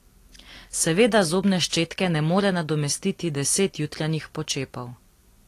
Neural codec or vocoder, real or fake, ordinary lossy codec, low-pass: none; real; AAC, 48 kbps; 14.4 kHz